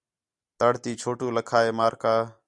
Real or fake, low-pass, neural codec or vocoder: real; 10.8 kHz; none